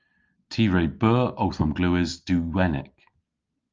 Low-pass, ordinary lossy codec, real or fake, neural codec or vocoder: 7.2 kHz; Opus, 24 kbps; real; none